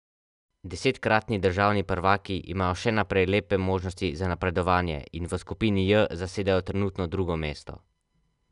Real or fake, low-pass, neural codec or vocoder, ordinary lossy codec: real; 10.8 kHz; none; none